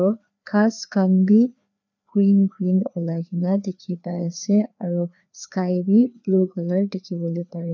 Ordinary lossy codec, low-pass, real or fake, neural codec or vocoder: none; 7.2 kHz; fake; codec, 16 kHz, 2 kbps, FreqCodec, larger model